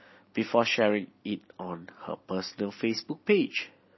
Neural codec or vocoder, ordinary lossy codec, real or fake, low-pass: none; MP3, 24 kbps; real; 7.2 kHz